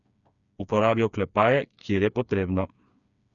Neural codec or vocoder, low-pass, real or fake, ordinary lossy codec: codec, 16 kHz, 4 kbps, FreqCodec, smaller model; 7.2 kHz; fake; none